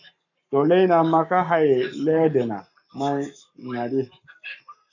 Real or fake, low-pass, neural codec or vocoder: fake; 7.2 kHz; codec, 44.1 kHz, 7.8 kbps, Pupu-Codec